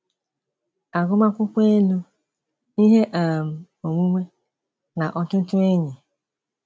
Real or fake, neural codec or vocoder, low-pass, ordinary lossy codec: real; none; none; none